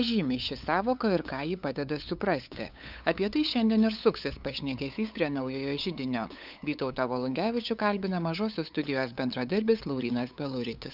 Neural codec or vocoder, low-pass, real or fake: codec, 16 kHz, 8 kbps, FunCodec, trained on LibriTTS, 25 frames a second; 5.4 kHz; fake